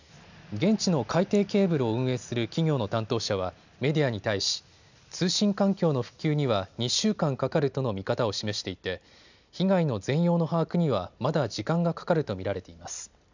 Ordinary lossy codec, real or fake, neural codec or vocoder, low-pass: none; real; none; 7.2 kHz